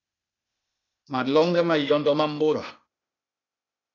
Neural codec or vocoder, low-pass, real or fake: codec, 16 kHz, 0.8 kbps, ZipCodec; 7.2 kHz; fake